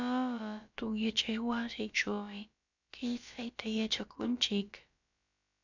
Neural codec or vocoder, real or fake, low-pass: codec, 16 kHz, about 1 kbps, DyCAST, with the encoder's durations; fake; 7.2 kHz